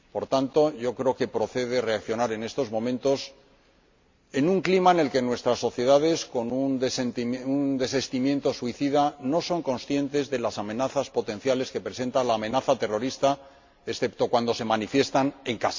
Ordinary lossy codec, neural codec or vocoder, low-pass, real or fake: MP3, 48 kbps; none; 7.2 kHz; real